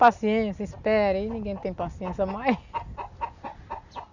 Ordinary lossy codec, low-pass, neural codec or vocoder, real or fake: none; 7.2 kHz; none; real